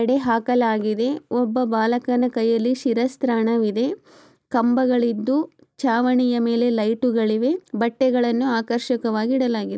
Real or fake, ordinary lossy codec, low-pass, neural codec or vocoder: real; none; none; none